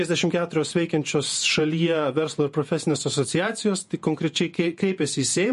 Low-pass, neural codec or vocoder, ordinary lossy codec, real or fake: 14.4 kHz; vocoder, 48 kHz, 128 mel bands, Vocos; MP3, 48 kbps; fake